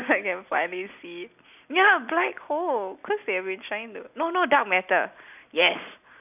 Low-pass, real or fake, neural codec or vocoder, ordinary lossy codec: 3.6 kHz; real; none; none